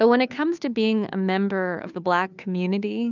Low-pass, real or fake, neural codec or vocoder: 7.2 kHz; fake; codec, 16 kHz, 2 kbps, FunCodec, trained on Chinese and English, 25 frames a second